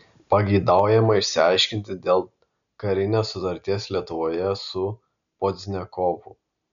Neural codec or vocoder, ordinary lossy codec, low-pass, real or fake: none; MP3, 96 kbps; 7.2 kHz; real